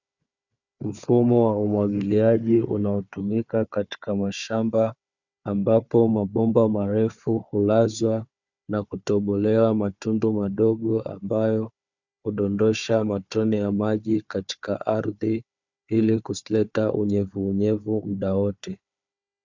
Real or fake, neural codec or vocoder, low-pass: fake; codec, 16 kHz, 4 kbps, FunCodec, trained on Chinese and English, 50 frames a second; 7.2 kHz